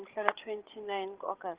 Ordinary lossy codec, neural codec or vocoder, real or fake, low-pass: Opus, 16 kbps; codec, 16 kHz, 16 kbps, FreqCodec, larger model; fake; 3.6 kHz